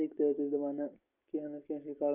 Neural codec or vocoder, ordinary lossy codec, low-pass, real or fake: none; Opus, 64 kbps; 3.6 kHz; real